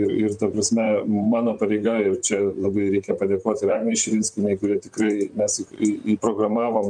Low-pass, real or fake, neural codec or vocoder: 9.9 kHz; fake; vocoder, 44.1 kHz, 128 mel bands, Pupu-Vocoder